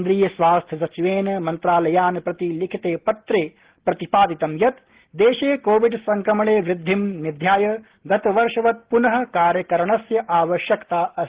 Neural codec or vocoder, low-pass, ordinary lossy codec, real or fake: none; 3.6 kHz; Opus, 16 kbps; real